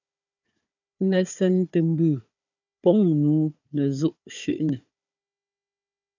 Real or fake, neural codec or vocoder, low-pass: fake; codec, 16 kHz, 4 kbps, FunCodec, trained on Chinese and English, 50 frames a second; 7.2 kHz